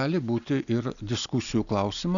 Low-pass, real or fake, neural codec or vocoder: 7.2 kHz; real; none